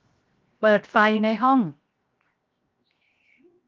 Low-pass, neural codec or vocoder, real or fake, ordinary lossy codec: 7.2 kHz; codec, 16 kHz, 0.7 kbps, FocalCodec; fake; Opus, 24 kbps